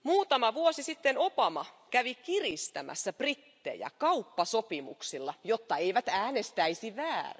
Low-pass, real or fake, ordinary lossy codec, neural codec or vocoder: none; real; none; none